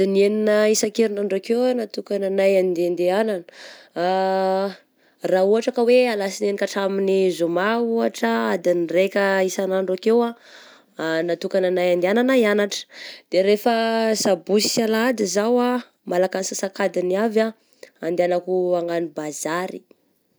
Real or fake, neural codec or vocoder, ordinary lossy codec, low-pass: real; none; none; none